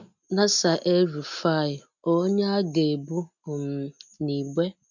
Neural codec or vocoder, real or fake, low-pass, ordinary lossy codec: none; real; 7.2 kHz; none